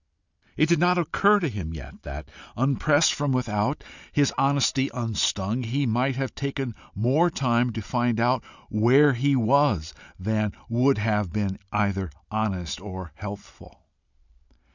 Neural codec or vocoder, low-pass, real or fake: none; 7.2 kHz; real